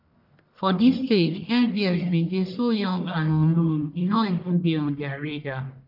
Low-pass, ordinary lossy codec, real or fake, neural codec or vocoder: 5.4 kHz; none; fake; codec, 44.1 kHz, 1.7 kbps, Pupu-Codec